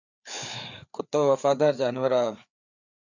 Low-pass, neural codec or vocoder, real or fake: 7.2 kHz; codec, 16 kHz, 4 kbps, FreqCodec, larger model; fake